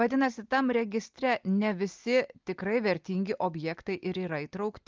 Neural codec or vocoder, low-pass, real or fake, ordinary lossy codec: none; 7.2 kHz; real; Opus, 32 kbps